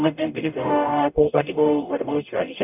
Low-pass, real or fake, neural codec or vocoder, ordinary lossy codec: 3.6 kHz; fake; codec, 44.1 kHz, 0.9 kbps, DAC; none